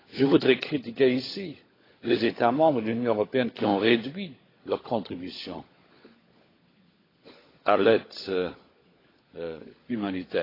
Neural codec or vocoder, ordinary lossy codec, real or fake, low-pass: codec, 16 kHz, 16 kbps, FunCodec, trained on LibriTTS, 50 frames a second; AAC, 24 kbps; fake; 5.4 kHz